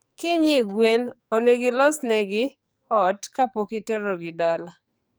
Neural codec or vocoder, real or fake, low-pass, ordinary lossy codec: codec, 44.1 kHz, 2.6 kbps, SNAC; fake; none; none